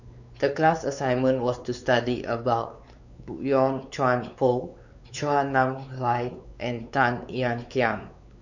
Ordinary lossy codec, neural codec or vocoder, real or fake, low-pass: none; codec, 16 kHz, 4 kbps, X-Codec, WavLM features, trained on Multilingual LibriSpeech; fake; 7.2 kHz